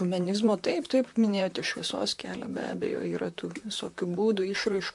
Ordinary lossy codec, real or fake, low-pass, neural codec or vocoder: MP3, 64 kbps; fake; 10.8 kHz; vocoder, 44.1 kHz, 128 mel bands, Pupu-Vocoder